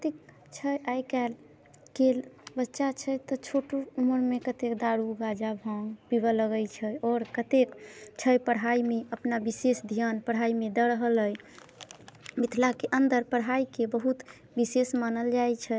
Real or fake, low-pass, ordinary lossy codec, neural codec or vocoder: real; none; none; none